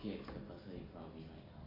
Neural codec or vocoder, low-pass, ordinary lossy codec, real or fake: none; 5.4 kHz; MP3, 48 kbps; real